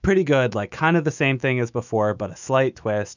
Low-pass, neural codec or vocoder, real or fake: 7.2 kHz; autoencoder, 48 kHz, 128 numbers a frame, DAC-VAE, trained on Japanese speech; fake